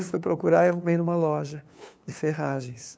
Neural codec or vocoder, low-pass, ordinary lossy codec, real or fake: codec, 16 kHz, 2 kbps, FunCodec, trained on LibriTTS, 25 frames a second; none; none; fake